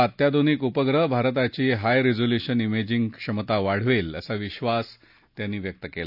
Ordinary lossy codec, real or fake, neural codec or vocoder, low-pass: none; real; none; 5.4 kHz